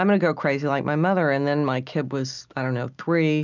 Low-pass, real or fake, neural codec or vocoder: 7.2 kHz; real; none